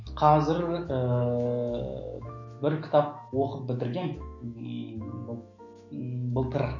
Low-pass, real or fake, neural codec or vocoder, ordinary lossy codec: 7.2 kHz; fake; autoencoder, 48 kHz, 128 numbers a frame, DAC-VAE, trained on Japanese speech; MP3, 48 kbps